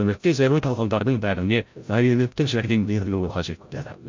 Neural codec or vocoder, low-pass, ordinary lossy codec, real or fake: codec, 16 kHz, 0.5 kbps, FreqCodec, larger model; 7.2 kHz; MP3, 48 kbps; fake